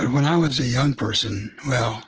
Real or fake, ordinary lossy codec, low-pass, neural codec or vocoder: real; Opus, 16 kbps; 7.2 kHz; none